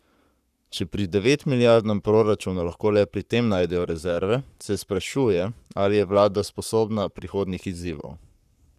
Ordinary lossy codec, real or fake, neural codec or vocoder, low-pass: none; fake; codec, 44.1 kHz, 7.8 kbps, Pupu-Codec; 14.4 kHz